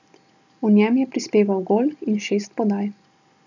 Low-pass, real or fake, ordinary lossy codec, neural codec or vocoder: 7.2 kHz; real; none; none